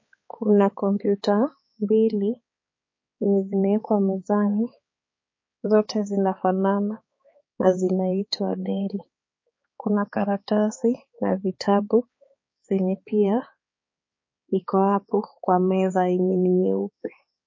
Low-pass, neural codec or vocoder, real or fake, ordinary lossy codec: 7.2 kHz; codec, 16 kHz, 4 kbps, X-Codec, HuBERT features, trained on balanced general audio; fake; MP3, 32 kbps